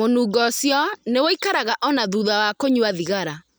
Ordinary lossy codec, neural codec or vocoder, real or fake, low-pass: none; none; real; none